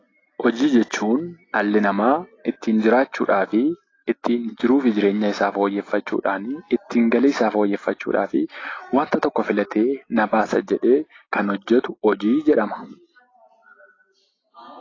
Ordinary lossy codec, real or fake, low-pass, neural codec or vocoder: AAC, 32 kbps; real; 7.2 kHz; none